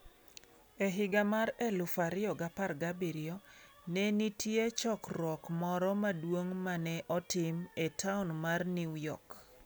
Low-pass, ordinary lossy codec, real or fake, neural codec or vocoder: none; none; real; none